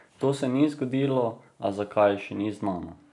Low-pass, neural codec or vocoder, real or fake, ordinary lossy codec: 10.8 kHz; none; real; none